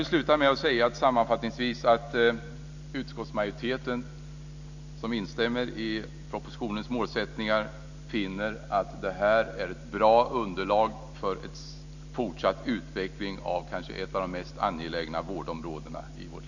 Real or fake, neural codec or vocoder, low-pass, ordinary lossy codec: real; none; 7.2 kHz; none